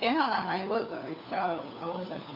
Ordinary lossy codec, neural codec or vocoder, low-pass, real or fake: none; codec, 16 kHz, 4 kbps, FunCodec, trained on LibriTTS, 50 frames a second; 5.4 kHz; fake